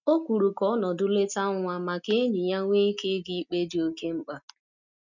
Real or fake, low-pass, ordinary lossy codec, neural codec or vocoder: real; 7.2 kHz; none; none